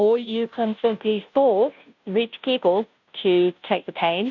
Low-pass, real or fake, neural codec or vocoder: 7.2 kHz; fake; codec, 16 kHz, 0.5 kbps, FunCodec, trained on Chinese and English, 25 frames a second